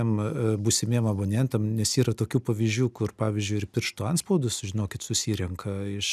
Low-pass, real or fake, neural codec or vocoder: 14.4 kHz; real; none